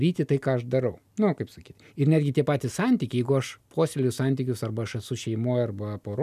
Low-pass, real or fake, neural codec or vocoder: 14.4 kHz; real; none